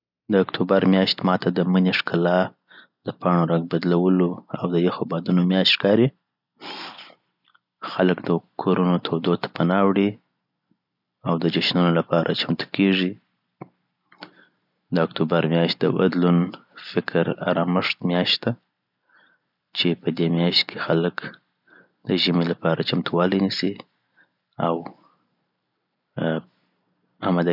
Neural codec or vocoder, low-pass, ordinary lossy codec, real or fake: none; 5.4 kHz; none; real